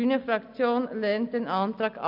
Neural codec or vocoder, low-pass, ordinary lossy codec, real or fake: none; 5.4 kHz; none; real